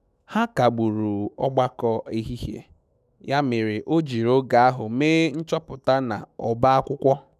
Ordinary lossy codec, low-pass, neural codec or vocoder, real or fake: none; 14.4 kHz; autoencoder, 48 kHz, 128 numbers a frame, DAC-VAE, trained on Japanese speech; fake